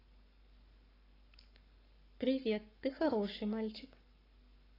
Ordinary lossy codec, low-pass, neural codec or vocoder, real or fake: AAC, 24 kbps; 5.4 kHz; codec, 44.1 kHz, 7.8 kbps, Pupu-Codec; fake